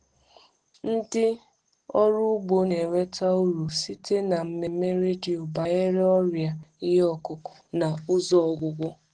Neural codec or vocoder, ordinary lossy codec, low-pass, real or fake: none; Opus, 16 kbps; 9.9 kHz; real